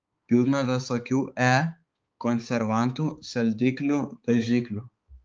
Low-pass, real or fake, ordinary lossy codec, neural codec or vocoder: 7.2 kHz; fake; Opus, 24 kbps; codec, 16 kHz, 4 kbps, X-Codec, HuBERT features, trained on balanced general audio